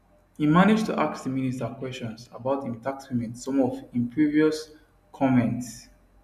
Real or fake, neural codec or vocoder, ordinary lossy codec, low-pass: real; none; none; 14.4 kHz